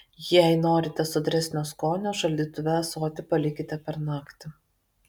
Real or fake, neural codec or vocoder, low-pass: real; none; 19.8 kHz